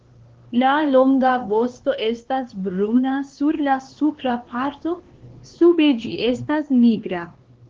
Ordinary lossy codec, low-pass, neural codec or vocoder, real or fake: Opus, 16 kbps; 7.2 kHz; codec, 16 kHz, 2 kbps, X-Codec, HuBERT features, trained on LibriSpeech; fake